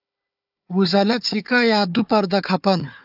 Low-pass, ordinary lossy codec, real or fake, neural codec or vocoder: 5.4 kHz; AAC, 48 kbps; fake; codec, 16 kHz, 4 kbps, FunCodec, trained on Chinese and English, 50 frames a second